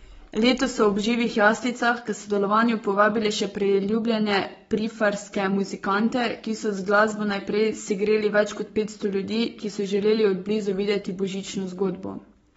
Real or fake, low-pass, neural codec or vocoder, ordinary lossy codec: fake; 19.8 kHz; codec, 44.1 kHz, 7.8 kbps, Pupu-Codec; AAC, 24 kbps